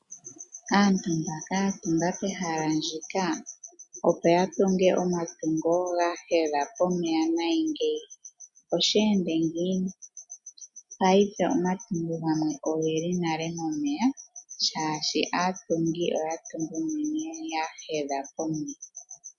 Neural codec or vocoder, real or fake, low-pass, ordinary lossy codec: none; real; 10.8 kHz; MP3, 64 kbps